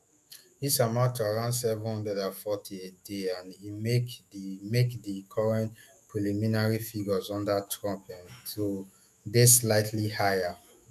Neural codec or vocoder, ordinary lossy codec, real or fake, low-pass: autoencoder, 48 kHz, 128 numbers a frame, DAC-VAE, trained on Japanese speech; none; fake; 14.4 kHz